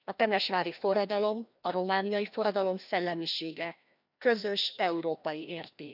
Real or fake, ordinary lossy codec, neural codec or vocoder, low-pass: fake; none; codec, 16 kHz, 1 kbps, FreqCodec, larger model; 5.4 kHz